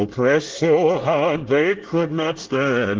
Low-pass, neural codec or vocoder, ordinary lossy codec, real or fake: 7.2 kHz; codec, 24 kHz, 1 kbps, SNAC; Opus, 16 kbps; fake